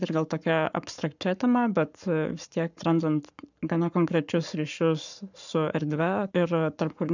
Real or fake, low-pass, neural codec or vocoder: fake; 7.2 kHz; codec, 44.1 kHz, 7.8 kbps, Pupu-Codec